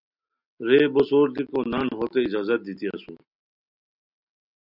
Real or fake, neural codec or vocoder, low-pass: real; none; 5.4 kHz